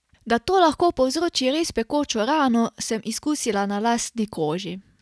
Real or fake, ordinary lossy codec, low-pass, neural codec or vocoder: real; none; none; none